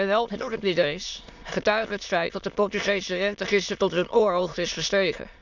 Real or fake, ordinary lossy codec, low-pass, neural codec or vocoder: fake; none; 7.2 kHz; autoencoder, 22.05 kHz, a latent of 192 numbers a frame, VITS, trained on many speakers